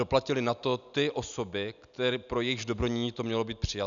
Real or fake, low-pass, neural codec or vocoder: real; 7.2 kHz; none